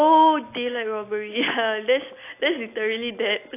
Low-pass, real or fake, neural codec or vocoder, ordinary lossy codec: 3.6 kHz; real; none; AAC, 24 kbps